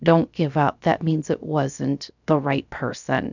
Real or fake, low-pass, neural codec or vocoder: fake; 7.2 kHz; codec, 16 kHz, 0.7 kbps, FocalCodec